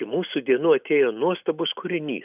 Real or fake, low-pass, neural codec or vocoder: real; 3.6 kHz; none